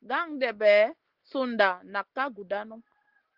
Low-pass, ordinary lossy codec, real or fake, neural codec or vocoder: 5.4 kHz; Opus, 16 kbps; real; none